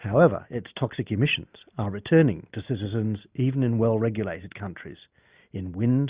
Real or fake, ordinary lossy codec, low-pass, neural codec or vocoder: real; Opus, 64 kbps; 3.6 kHz; none